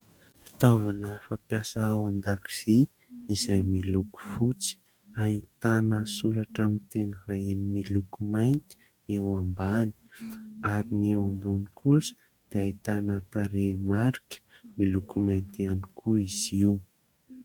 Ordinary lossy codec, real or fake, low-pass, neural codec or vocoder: MP3, 96 kbps; fake; 19.8 kHz; codec, 44.1 kHz, 2.6 kbps, DAC